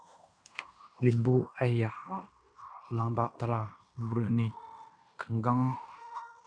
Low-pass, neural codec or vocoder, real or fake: 9.9 kHz; codec, 16 kHz in and 24 kHz out, 0.9 kbps, LongCat-Audio-Codec, fine tuned four codebook decoder; fake